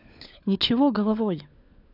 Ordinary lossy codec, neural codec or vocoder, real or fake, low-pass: none; codec, 16 kHz, 4 kbps, FunCodec, trained on LibriTTS, 50 frames a second; fake; 5.4 kHz